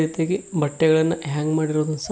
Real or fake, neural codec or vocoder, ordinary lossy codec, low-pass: real; none; none; none